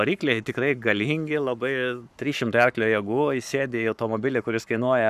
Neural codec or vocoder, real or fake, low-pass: codec, 44.1 kHz, 7.8 kbps, Pupu-Codec; fake; 14.4 kHz